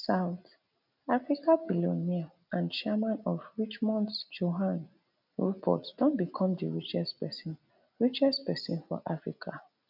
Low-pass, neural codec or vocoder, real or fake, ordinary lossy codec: 5.4 kHz; none; real; none